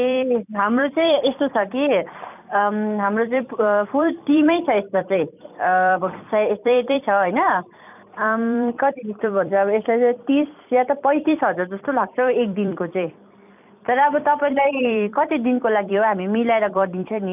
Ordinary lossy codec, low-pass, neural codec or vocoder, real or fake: none; 3.6 kHz; vocoder, 44.1 kHz, 128 mel bands every 256 samples, BigVGAN v2; fake